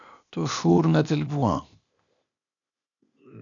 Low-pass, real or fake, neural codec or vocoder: 7.2 kHz; fake; codec, 16 kHz, 0.8 kbps, ZipCodec